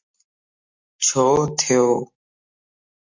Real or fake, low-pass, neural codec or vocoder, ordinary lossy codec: real; 7.2 kHz; none; MP3, 48 kbps